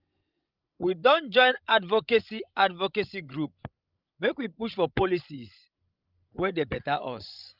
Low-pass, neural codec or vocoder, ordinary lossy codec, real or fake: 5.4 kHz; codec, 16 kHz, 16 kbps, FreqCodec, larger model; Opus, 32 kbps; fake